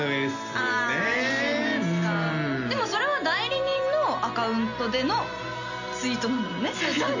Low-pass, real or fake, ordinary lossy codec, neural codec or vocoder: 7.2 kHz; real; none; none